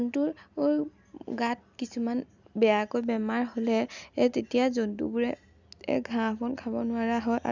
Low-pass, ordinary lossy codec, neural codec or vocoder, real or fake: 7.2 kHz; none; none; real